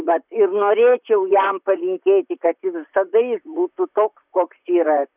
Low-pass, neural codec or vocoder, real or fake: 3.6 kHz; vocoder, 44.1 kHz, 128 mel bands, Pupu-Vocoder; fake